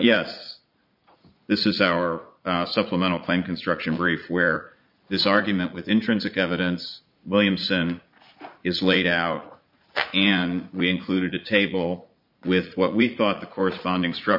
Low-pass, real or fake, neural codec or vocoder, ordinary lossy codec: 5.4 kHz; fake; vocoder, 44.1 kHz, 80 mel bands, Vocos; MP3, 48 kbps